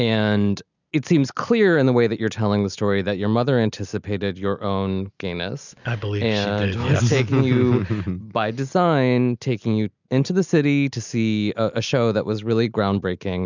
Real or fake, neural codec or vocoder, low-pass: real; none; 7.2 kHz